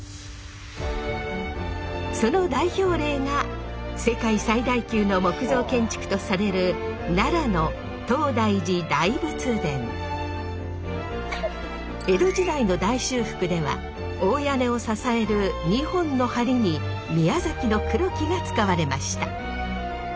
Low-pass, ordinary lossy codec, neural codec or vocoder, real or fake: none; none; none; real